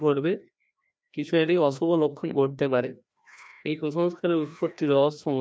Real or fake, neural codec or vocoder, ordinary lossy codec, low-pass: fake; codec, 16 kHz, 1 kbps, FreqCodec, larger model; none; none